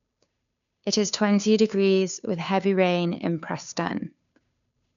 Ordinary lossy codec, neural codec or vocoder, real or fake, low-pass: none; codec, 16 kHz, 2 kbps, FunCodec, trained on Chinese and English, 25 frames a second; fake; 7.2 kHz